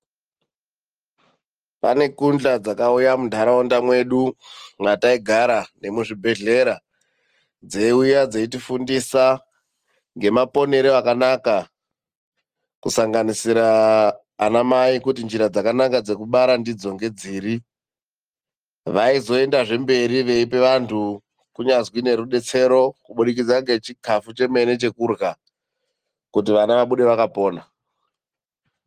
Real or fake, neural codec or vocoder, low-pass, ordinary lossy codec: real; none; 14.4 kHz; Opus, 24 kbps